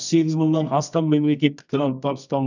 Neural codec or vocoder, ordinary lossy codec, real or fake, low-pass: codec, 24 kHz, 0.9 kbps, WavTokenizer, medium music audio release; none; fake; 7.2 kHz